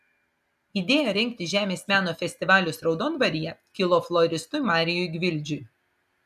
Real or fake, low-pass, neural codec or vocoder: real; 14.4 kHz; none